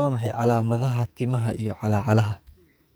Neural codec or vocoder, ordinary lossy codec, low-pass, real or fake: codec, 44.1 kHz, 2.6 kbps, SNAC; none; none; fake